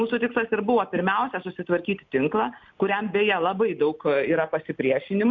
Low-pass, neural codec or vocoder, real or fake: 7.2 kHz; none; real